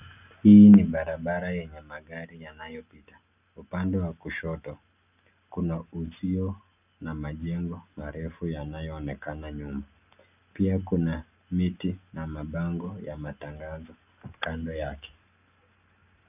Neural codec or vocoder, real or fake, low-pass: none; real; 3.6 kHz